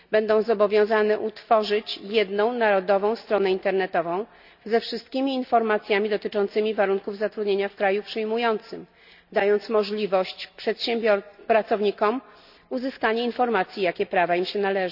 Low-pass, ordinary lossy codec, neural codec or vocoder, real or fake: 5.4 kHz; none; none; real